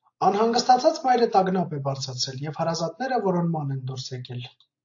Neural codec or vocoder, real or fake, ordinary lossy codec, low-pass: none; real; AAC, 48 kbps; 7.2 kHz